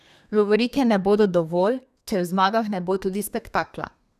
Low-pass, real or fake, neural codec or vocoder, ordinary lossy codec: 14.4 kHz; fake; codec, 32 kHz, 1.9 kbps, SNAC; none